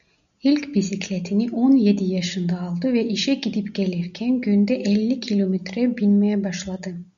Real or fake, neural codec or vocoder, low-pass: real; none; 7.2 kHz